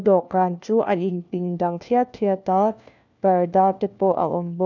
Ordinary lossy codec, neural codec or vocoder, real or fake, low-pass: none; codec, 16 kHz, 1 kbps, FunCodec, trained on LibriTTS, 50 frames a second; fake; 7.2 kHz